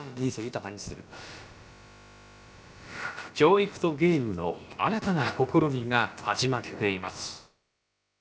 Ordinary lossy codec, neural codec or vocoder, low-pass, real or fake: none; codec, 16 kHz, about 1 kbps, DyCAST, with the encoder's durations; none; fake